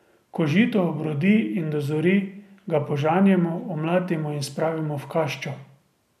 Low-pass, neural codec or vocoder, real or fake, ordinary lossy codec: 14.4 kHz; none; real; none